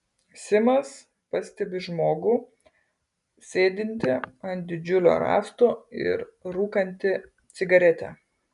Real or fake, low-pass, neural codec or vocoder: real; 10.8 kHz; none